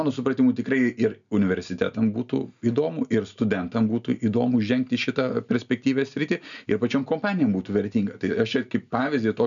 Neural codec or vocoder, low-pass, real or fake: none; 7.2 kHz; real